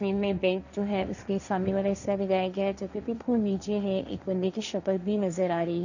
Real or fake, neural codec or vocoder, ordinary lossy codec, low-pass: fake; codec, 16 kHz, 1.1 kbps, Voila-Tokenizer; none; 7.2 kHz